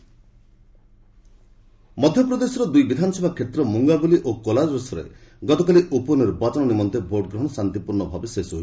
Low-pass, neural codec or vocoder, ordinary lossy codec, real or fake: none; none; none; real